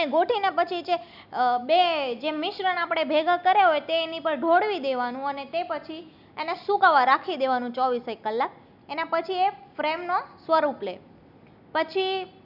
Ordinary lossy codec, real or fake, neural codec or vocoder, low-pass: none; real; none; 5.4 kHz